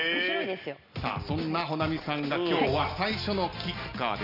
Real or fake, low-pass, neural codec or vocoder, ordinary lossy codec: real; 5.4 kHz; none; none